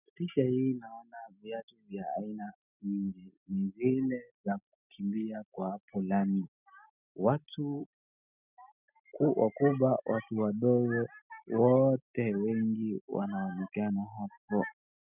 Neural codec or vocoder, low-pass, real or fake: none; 3.6 kHz; real